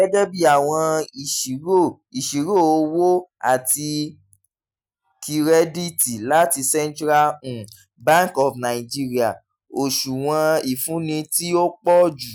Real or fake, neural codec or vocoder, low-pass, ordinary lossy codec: real; none; none; none